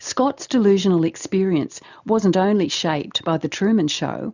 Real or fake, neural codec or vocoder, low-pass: real; none; 7.2 kHz